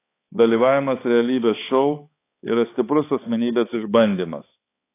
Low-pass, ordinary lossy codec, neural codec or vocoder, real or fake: 3.6 kHz; AAC, 24 kbps; codec, 16 kHz, 4 kbps, X-Codec, HuBERT features, trained on balanced general audio; fake